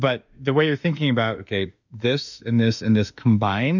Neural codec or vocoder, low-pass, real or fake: autoencoder, 48 kHz, 32 numbers a frame, DAC-VAE, trained on Japanese speech; 7.2 kHz; fake